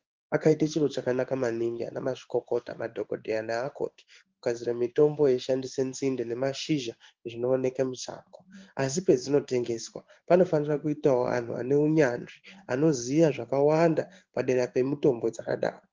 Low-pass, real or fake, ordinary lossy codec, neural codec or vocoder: 7.2 kHz; fake; Opus, 32 kbps; codec, 16 kHz in and 24 kHz out, 1 kbps, XY-Tokenizer